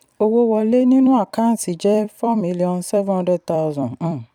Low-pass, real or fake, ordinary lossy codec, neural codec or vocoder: 19.8 kHz; fake; none; vocoder, 44.1 kHz, 128 mel bands every 256 samples, BigVGAN v2